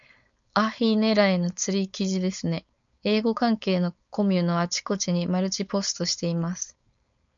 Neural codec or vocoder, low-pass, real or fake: codec, 16 kHz, 4.8 kbps, FACodec; 7.2 kHz; fake